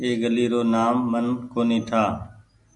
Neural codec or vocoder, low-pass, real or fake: none; 10.8 kHz; real